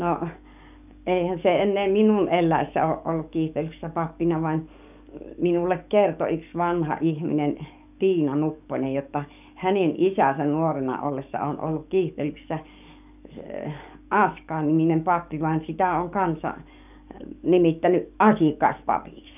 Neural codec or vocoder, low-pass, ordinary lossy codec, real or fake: codec, 44.1 kHz, 7.8 kbps, DAC; 3.6 kHz; none; fake